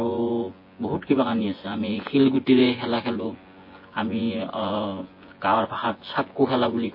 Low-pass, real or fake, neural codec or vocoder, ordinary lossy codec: 5.4 kHz; fake; vocoder, 24 kHz, 100 mel bands, Vocos; MP3, 24 kbps